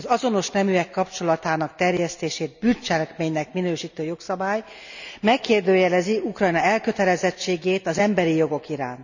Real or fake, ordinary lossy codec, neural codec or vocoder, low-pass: real; none; none; 7.2 kHz